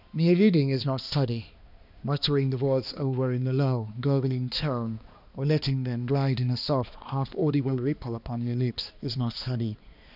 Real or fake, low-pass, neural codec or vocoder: fake; 5.4 kHz; codec, 16 kHz, 2 kbps, X-Codec, HuBERT features, trained on balanced general audio